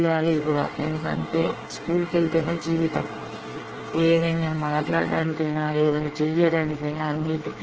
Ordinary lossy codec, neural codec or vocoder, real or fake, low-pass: Opus, 16 kbps; codec, 24 kHz, 1 kbps, SNAC; fake; 7.2 kHz